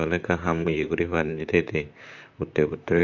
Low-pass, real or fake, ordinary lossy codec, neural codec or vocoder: 7.2 kHz; fake; none; vocoder, 44.1 kHz, 128 mel bands, Pupu-Vocoder